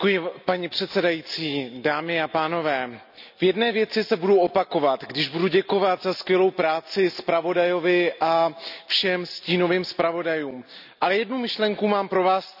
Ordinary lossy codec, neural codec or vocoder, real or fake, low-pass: none; none; real; 5.4 kHz